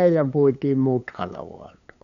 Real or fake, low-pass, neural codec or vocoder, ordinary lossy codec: fake; 7.2 kHz; codec, 16 kHz, 8 kbps, FunCodec, trained on LibriTTS, 25 frames a second; none